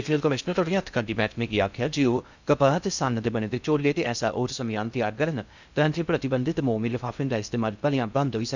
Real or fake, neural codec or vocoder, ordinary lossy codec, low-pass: fake; codec, 16 kHz in and 24 kHz out, 0.6 kbps, FocalCodec, streaming, 2048 codes; none; 7.2 kHz